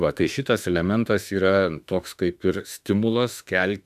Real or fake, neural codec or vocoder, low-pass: fake; autoencoder, 48 kHz, 32 numbers a frame, DAC-VAE, trained on Japanese speech; 14.4 kHz